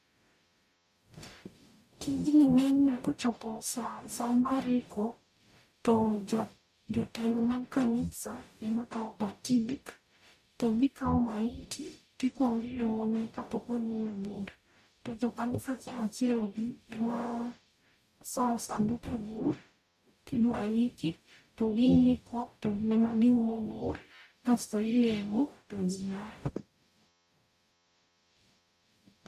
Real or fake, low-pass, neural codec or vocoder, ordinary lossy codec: fake; 14.4 kHz; codec, 44.1 kHz, 0.9 kbps, DAC; AAC, 96 kbps